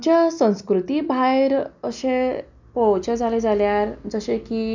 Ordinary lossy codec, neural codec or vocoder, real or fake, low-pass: none; none; real; 7.2 kHz